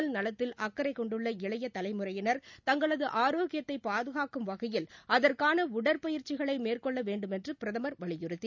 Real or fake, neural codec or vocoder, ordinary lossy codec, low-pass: real; none; none; 7.2 kHz